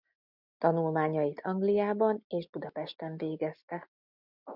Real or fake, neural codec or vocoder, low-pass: real; none; 5.4 kHz